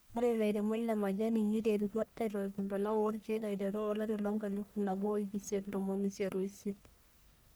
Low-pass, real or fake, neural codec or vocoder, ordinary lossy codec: none; fake; codec, 44.1 kHz, 1.7 kbps, Pupu-Codec; none